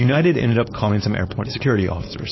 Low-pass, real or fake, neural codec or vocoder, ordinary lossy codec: 7.2 kHz; fake; codec, 16 kHz, 4.8 kbps, FACodec; MP3, 24 kbps